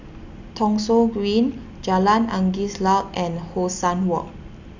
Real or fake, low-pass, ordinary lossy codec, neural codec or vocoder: real; 7.2 kHz; none; none